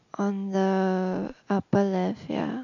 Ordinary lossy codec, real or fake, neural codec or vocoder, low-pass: none; real; none; 7.2 kHz